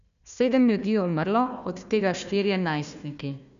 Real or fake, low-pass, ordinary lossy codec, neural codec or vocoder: fake; 7.2 kHz; none; codec, 16 kHz, 1 kbps, FunCodec, trained on Chinese and English, 50 frames a second